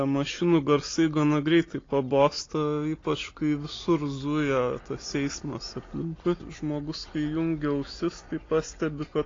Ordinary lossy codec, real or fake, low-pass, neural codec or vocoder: AAC, 32 kbps; real; 7.2 kHz; none